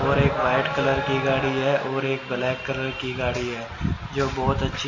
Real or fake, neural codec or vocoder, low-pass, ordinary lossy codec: real; none; 7.2 kHz; MP3, 32 kbps